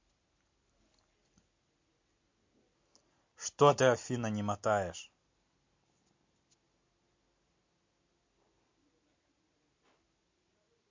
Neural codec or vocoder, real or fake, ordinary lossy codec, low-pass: none; real; MP3, 48 kbps; 7.2 kHz